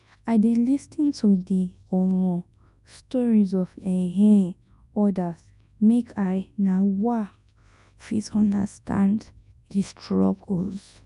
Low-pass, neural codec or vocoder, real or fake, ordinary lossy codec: 10.8 kHz; codec, 24 kHz, 0.9 kbps, WavTokenizer, large speech release; fake; none